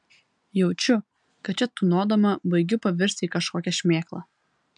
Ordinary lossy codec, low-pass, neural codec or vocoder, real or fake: MP3, 96 kbps; 9.9 kHz; none; real